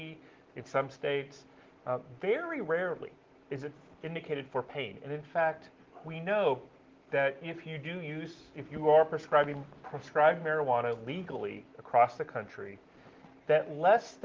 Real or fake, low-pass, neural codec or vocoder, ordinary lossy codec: real; 7.2 kHz; none; Opus, 16 kbps